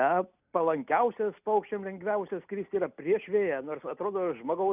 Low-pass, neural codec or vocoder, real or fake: 3.6 kHz; none; real